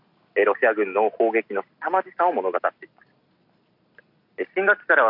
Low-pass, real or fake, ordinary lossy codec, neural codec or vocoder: 5.4 kHz; real; none; none